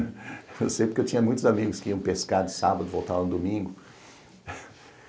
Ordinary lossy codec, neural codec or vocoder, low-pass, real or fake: none; none; none; real